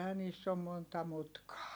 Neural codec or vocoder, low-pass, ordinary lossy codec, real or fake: none; none; none; real